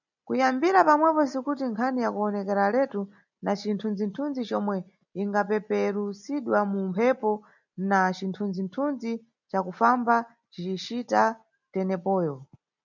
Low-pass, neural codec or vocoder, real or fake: 7.2 kHz; none; real